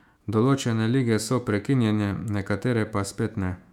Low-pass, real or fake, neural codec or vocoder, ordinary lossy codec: 19.8 kHz; fake; autoencoder, 48 kHz, 128 numbers a frame, DAC-VAE, trained on Japanese speech; none